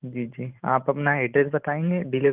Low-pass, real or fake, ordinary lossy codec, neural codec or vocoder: 3.6 kHz; fake; Opus, 24 kbps; vocoder, 44.1 kHz, 128 mel bands every 512 samples, BigVGAN v2